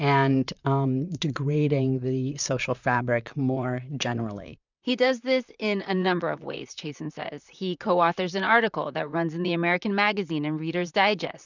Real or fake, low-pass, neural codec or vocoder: fake; 7.2 kHz; vocoder, 44.1 kHz, 128 mel bands, Pupu-Vocoder